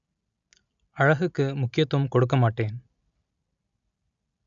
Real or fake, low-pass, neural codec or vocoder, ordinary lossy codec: real; 7.2 kHz; none; none